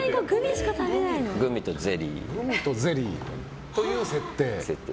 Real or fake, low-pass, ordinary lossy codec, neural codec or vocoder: real; none; none; none